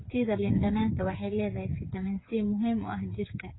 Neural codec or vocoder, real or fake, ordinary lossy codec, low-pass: codec, 16 kHz, 8 kbps, FreqCodec, smaller model; fake; AAC, 16 kbps; 7.2 kHz